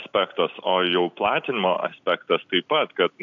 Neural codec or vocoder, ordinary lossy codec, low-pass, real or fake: none; AAC, 96 kbps; 7.2 kHz; real